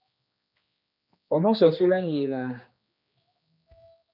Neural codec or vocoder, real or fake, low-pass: codec, 16 kHz, 2 kbps, X-Codec, HuBERT features, trained on general audio; fake; 5.4 kHz